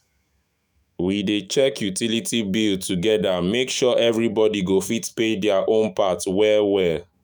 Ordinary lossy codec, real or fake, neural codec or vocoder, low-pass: none; fake; autoencoder, 48 kHz, 128 numbers a frame, DAC-VAE, trained on Japanese speech; none